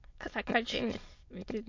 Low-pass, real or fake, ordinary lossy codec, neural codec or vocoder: 7.2 kHz; fake; MP3, 48 kbps; autoencoder, 22.05 kHz, a latent of 192 numbers a frame, VITS, trained on many speakers